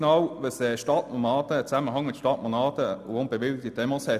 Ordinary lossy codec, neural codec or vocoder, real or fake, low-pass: none; none; real; 14.4 kHz